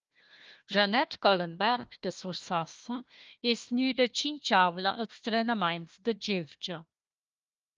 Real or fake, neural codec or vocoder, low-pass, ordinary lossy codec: fake; codec, 16 kHz, 1 kbps, FunCodec, trained on Chinese and English, 50 frames a second; 7.2 kHz; Opus, 24 kbps